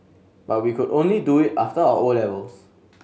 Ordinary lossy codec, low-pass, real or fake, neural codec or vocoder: none; none; real; none